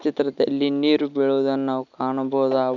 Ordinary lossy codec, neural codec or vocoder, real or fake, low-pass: none; none; real; 7.2 kHz